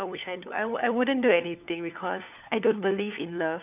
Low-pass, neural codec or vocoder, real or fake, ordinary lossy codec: 3.6 kHz; codec, 16 kHz, 4 kbps, FunCodec, trained on LibriTTS, 50 frames a second; fake; none